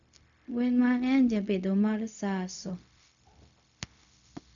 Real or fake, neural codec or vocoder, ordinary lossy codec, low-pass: fake; codec, 16 kHz, 0.4 kbps, LongCat-Audio-Codec; none; 7.2 kHz